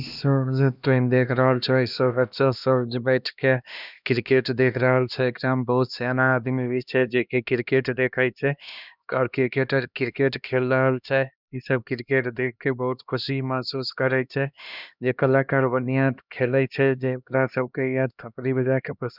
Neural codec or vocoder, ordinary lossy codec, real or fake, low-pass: codec, 16 kHz, 1 kbps, X-Codec, HuBERT features, trained on LibriSpeech; none; fake; 5.4 kHz